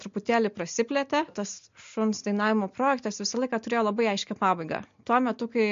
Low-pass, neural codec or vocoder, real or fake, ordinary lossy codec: 7.2 kHz; none; real; MP3, 48 kbps